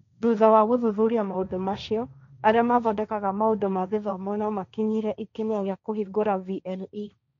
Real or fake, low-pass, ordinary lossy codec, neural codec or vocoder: fake; 7.2 kHz; none; codec, 16 kHz, 1.1 kbps, Voila-Tokenizer